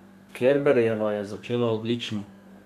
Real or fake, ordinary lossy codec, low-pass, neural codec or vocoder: fake; none; 14.4 kHz; codec, 32 kHz, 1.9 kbps, SNAC